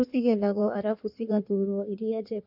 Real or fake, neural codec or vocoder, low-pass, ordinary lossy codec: fake; codec, 16 kHz in and 24 kHz out, 1.1 kbps, FireRedTTS-2 codec; 5.4 kHz; none